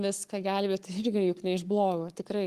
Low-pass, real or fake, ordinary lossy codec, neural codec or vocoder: 14.4 kHz; fake; Opus, 24 kbps; codec, 44.1 kHz, 7.8 kbps, Pupu-Codec